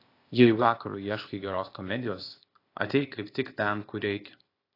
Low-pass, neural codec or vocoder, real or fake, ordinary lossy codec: 5.4 kHz; codec, 16 kHz, 0.8 kbps, ZipCodec; fake; AAC, 32 kbps